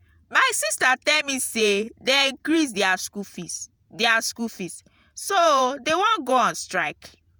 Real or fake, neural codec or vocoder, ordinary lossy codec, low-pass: fake; vocoder, 48 kHz, 128 mel bands, Vocos; none; none